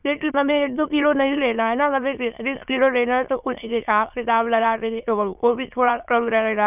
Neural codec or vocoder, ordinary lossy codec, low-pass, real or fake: autoencoder, 22.05 kHz, a latent of 192 numbers a frame, VITS, trained on many speakers; none; 3.6 kHz; fake